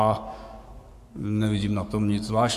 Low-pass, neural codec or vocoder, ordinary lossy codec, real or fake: 14.4 kHz; codec, 44.1 kHz, 7.8 kbps, Pupu-Codec; AAC, 96 kbps; fake